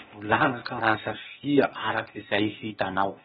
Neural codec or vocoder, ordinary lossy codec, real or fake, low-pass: codec, 16 kHz, 0.8 kbps, ZipCodec; AAC, 16 kbps; fake; 7.2 kHz